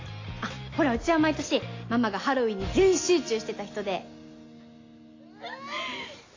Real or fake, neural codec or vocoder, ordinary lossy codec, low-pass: real; none; AAC, 32 kbps; 7.2 kHz